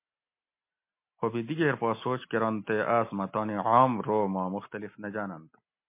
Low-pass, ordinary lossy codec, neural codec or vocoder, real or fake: 3.6 kHz; MP3, 24 kbps; none; real